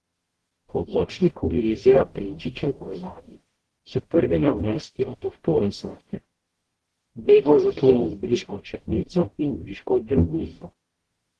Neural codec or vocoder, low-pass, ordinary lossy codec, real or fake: codec, 44.1 kHz, 0.9 kbps, DAC; 10.8 kHz; Opus, 16 kbps; fake